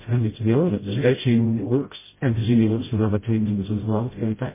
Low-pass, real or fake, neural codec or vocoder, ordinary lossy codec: 3.6 kHz; fake; codec, 16 kHz, 0.5 kbps, FreqCodec, smaller model; MP3, 16 kbps